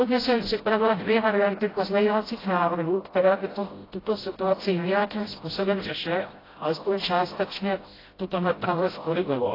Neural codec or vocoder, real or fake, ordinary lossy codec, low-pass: codec, 16 kHz, 0.5 kbps, FreqCodec, smaller model; fake; AAC, 24 kbps; 5.4 kHz